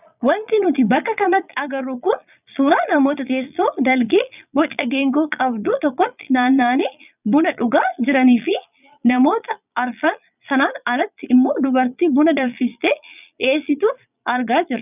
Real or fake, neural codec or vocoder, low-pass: fake; vocoder, 22.05 kHz, 80 mel bands, Vocos; 3.6 kHz